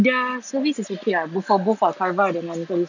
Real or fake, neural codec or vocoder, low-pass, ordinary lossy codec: real; none; 7.2 kHz; none